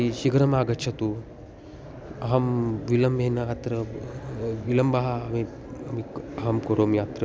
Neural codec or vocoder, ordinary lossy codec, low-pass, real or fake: none; none; none; real